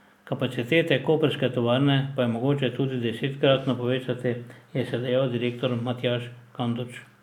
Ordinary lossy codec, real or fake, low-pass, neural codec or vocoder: none; real; 19.8 kHz; none